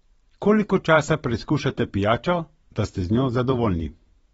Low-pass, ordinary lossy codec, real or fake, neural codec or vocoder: 19.8 kHz; AAC, 24 kbps; fake; vocoder, 44.1 kHz, 128 mel bands every 512 samples, BigVGAN v2